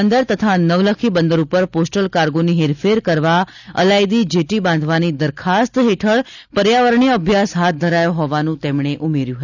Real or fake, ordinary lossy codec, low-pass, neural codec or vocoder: real; none; 7.2 kHz; none